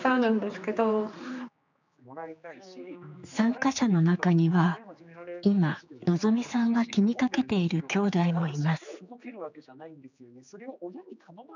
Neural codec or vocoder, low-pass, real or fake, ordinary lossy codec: codec, 16 kHz, 4 kbps, X-Codec, HuBERT features, trained on general audio; 7.2 kHz; fake; none